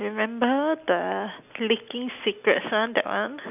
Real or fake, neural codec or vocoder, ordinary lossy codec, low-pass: real; none; none; 3.6 kHz